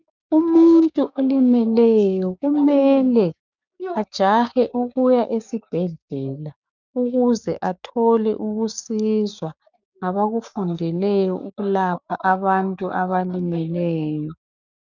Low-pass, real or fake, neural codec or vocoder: 7.2 kHz; fake; codec, 16 kHz, 6 kbps, DAC